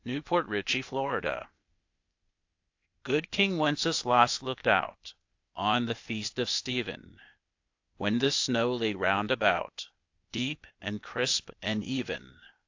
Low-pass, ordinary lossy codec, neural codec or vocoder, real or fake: 7.2 kHz; AAC, 48 kbps; codec, 16 kHz, 0.8 kbps, ZipCodec; fake